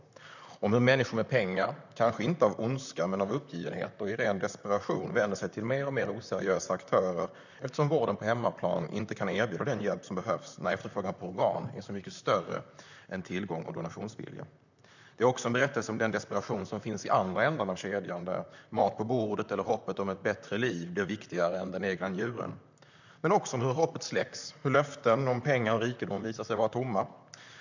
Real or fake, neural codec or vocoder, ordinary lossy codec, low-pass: fake; vocoder, 44.1 kHz, 128 mel bands, Pupu-Vocoder; none; 7.2 kHz